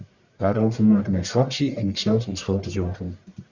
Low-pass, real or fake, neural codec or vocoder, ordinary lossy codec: 7.2 kHz; fake; codec, 44.1 kHz, 1.7 kbps, Pupu-Codec; Opus, 64 kbps